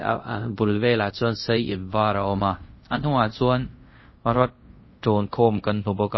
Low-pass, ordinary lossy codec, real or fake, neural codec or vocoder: 7.2 kHz; MP3, 24 kbps; fake; codec, 24 kHz, 0.5 kbps, DualCodec